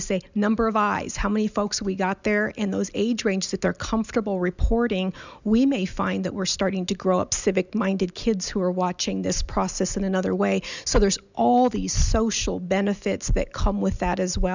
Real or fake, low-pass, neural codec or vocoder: real; 7.2 kHz; none